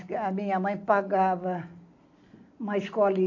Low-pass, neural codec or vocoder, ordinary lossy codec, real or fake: 7.2 kHz; none; AAC, 48 kbps; real